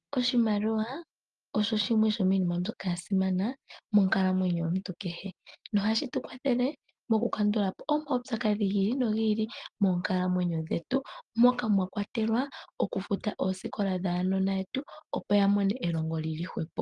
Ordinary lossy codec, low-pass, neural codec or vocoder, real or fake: Opus, 32 kbps; 10.8 kHz; none; real